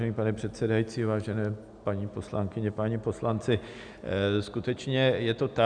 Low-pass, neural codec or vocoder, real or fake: 9.9 kHz; none; real